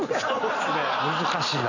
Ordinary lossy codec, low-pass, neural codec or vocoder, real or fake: none; 7.2 kHz; none; real